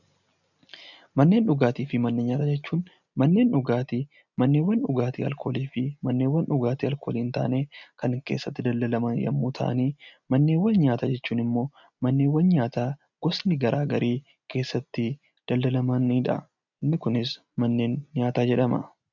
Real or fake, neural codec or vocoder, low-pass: real; none; 7.2 kHz